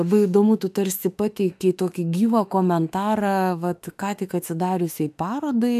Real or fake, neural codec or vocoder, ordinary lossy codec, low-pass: fake; autoencoder, 48 kHz, 32 numbers a frame, DAC-VAE, trained on Japanese speech; AAC, 96 kbps; 14.4 kHz